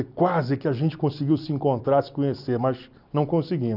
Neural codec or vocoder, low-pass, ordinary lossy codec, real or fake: none; 5.4 kHz; none; real